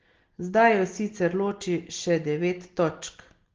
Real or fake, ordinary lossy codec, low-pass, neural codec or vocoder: real; Opus, 16 kbps; 7.2 kHz; none